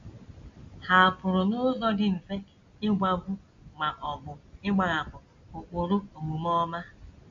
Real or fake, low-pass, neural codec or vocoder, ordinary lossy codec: real; 7.2 kHz; none; MP3, 48 kbps